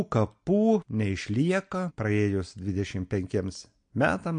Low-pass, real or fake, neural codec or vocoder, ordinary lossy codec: 9.9 kHz; real; none; MP3, 48 kbps